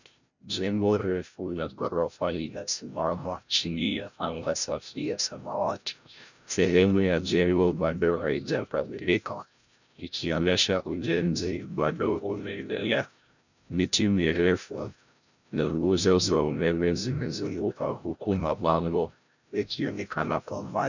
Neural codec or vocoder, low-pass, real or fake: codec, 16 kHz, 0.5 kbps, FreqCodec, larger model; 7.2 kHz; fake